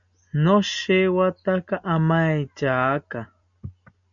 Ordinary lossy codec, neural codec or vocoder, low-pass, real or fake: MP3, 64 kbps; none; 7.2 kHz; real